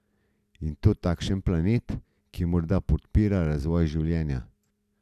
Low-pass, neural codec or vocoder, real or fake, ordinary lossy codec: 14.4 kHz; none; real; none